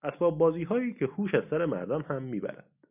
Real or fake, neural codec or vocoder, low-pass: real; none; 3.6 kHz